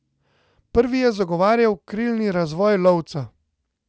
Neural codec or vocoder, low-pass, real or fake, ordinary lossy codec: none; none; real; none